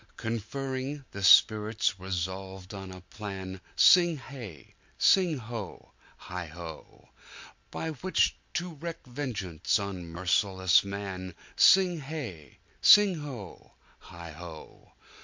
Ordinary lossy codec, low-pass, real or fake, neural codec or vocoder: MP3, 48 kbps; 7.2 kHz; real; none